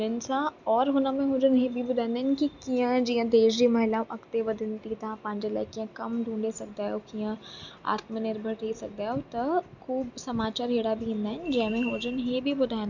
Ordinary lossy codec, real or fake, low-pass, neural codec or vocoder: none; real; 7.2 kHz; none